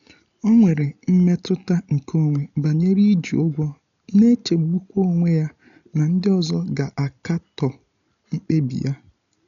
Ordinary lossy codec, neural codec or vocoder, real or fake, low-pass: none; none; real; 7.2 kHz